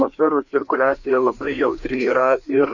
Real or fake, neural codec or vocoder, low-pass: fake; codec, 16 kHz, 2 kbps, FreqCodec, larger model; 7.2 kHz